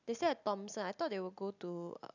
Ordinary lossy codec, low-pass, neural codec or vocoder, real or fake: none; 7.2 kHz; none; real